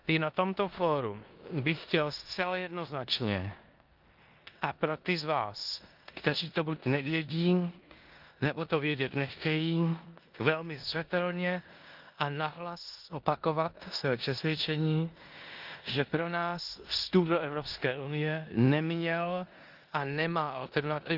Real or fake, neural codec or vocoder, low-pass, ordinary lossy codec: fake; codec, 16 kHz in and 24 kHz out, 0.9 kbps, LongCat-Audio-Codec, four codebook decoder; 5.4 kHz; Opus, 24 kbps